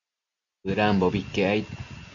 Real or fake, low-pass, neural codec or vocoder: real; 7.2 kHz; none